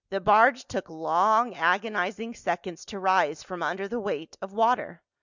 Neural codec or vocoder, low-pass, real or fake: none; 7.2 kHz; real